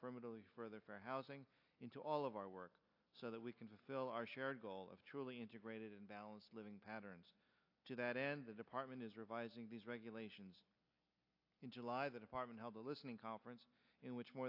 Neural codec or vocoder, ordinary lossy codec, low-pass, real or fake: none; AAC, 48 kbps; 5.4 kHz; real